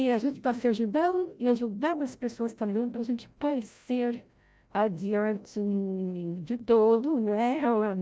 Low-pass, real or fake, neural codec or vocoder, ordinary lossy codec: none; fake; codec, 16 kHz, 0.5 kbps, FreqCodec, larger model; none